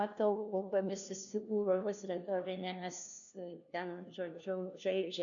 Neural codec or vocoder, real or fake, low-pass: codec, 16 kHz, 1 kbps, FunCodec, trained on LibriTTS, 50 frames a second; fake; 7.2 kHz